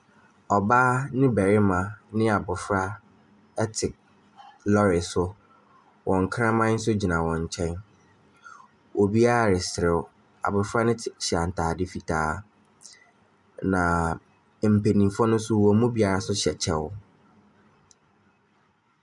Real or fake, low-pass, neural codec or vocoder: real; 10.8 kHz; none